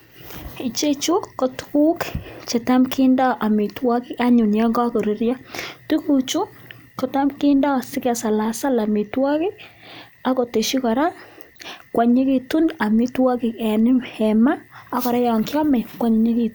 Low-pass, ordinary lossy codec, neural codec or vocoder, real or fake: none; none; none; real